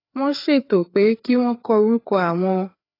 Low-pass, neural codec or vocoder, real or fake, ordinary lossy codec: 5.4 kHz; codec, 16 kHz, 4 kbps, FreqCodec, larger model; fake; AAC, 24 kbps